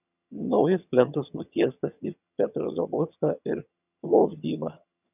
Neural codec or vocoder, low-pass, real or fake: vocoder, 22.05 kHz, 80 mel bands, HiFi-GAN; 3.6 kHz; fake